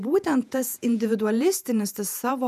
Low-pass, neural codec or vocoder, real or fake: 14.4 kHz; vocoder, 48 kHz, 128 mel bands, Vocos; fake